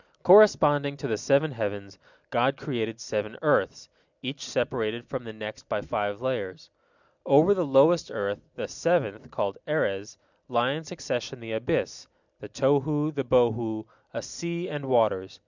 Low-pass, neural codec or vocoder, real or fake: 7.2 kHz; none; real